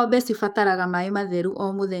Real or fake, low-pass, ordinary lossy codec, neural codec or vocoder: fake; 19.8 kHz; none; codec, 44.1 kHz, 7.8 kbps, DAC